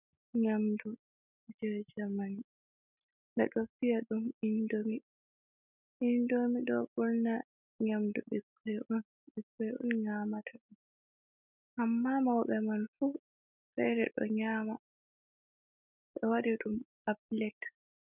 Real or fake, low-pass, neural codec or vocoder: real; 3.6 kHz; none